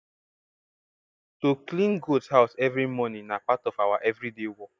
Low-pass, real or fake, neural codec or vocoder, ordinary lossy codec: none; real; none; none